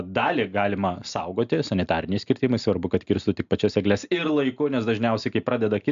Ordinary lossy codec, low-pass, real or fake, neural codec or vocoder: MP3, 64 kbps; 7.2 kHz; real; none